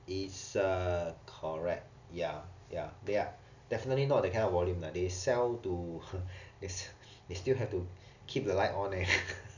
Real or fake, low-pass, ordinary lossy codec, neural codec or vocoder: real; 7.2 kHz; none; none